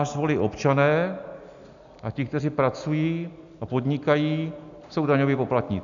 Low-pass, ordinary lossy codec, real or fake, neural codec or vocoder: 7.2 kHz; MP3, 96 kbps; real; none